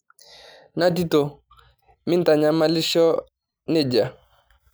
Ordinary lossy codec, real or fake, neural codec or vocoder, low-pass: none; real; none; none